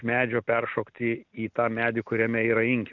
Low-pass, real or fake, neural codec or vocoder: 7.2 kHz; real; none